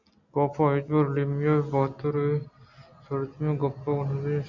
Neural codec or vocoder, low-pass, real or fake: none; 7.2 kHz; real